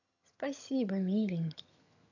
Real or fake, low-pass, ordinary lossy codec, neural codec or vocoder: fake; 7.2 kHz; none; vocoder, 22.05 kHz, 80 mel bands, HiFi-GAN